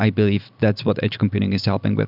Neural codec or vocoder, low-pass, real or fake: none; 5.4 kHz; real